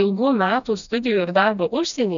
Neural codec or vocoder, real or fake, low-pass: codec, 16 kHz, 1 kbps, FreqCodec, smaller model; fake; 7.2 kHz